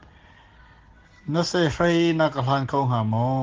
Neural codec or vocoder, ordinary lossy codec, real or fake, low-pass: none; Opus, 16 kbps; real; 7.2 kHz